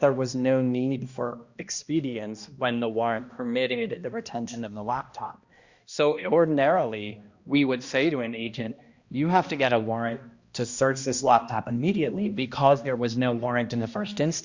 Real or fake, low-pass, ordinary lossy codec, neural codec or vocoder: fake; 7.2 kHz; Opus, 64 kbps; codec, 16 kHz, 1 kbps, X-Codec, HuBERT features, trained on balanced general audio